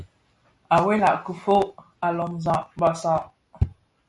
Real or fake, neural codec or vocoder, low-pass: real; none; 10.8 kHz